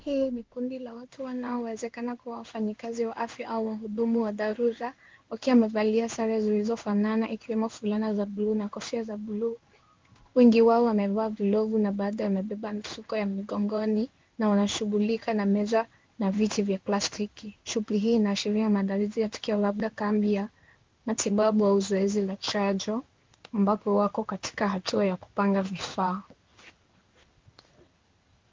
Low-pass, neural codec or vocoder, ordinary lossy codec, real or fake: 7.2 kHz; codec, 16 kHz in and 24 kHz out, 1 kbps, XY-Tokenizer; Opus, 32 kbps; fake